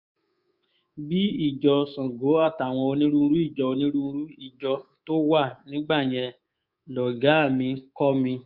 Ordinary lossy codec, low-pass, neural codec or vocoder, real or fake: Opus, 64 kbps; 5.4 kHz; codec, 24 kHz, 3.1 kbps, DualCodec; fake